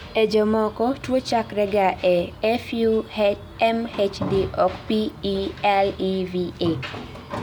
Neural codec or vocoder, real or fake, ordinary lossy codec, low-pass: none; real; none; none